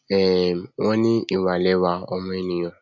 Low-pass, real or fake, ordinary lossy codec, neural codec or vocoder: 7.2 kHz; real; MP3, 64 kbps; none